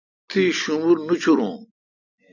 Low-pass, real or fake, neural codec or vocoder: 7.2 kHz; real; none